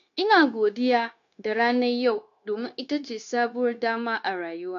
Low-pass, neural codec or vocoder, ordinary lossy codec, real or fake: 7.2 kHz; codec, 16 kHz, 0.9 kbps, LongCat-Audio-Codec; AAC, 64 kbps; fake